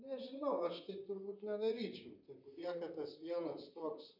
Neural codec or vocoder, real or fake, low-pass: vocoder, 44.1 kHz, 128 mel bands, Pupu-Vocoder; fake; 5.4 kHz